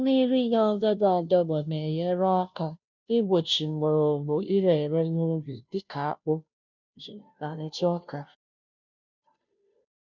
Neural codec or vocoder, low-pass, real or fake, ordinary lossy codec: codec, 16 kHz, 0.5 kbps, FunCodec, trained on Chinese and English, 25 frames a second; 7.2 kHz; fake; none